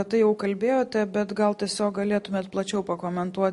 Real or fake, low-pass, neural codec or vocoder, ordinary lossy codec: real; 14.4 kHz; none; MP3, 48 kbps